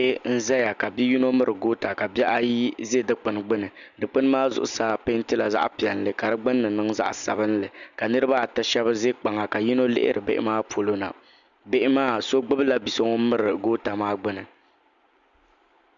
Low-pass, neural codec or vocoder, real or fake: 7.2 kHz; none; real